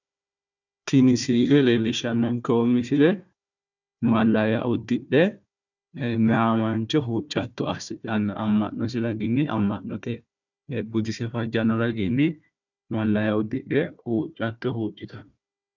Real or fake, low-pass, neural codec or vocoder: fake; 7.2 kHz; codec, 16 kHz, 1 kbps, FunCodec, trained on Chinese and English, 50 frames a second